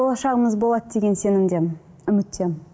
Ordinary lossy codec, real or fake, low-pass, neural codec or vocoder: none; real; none; none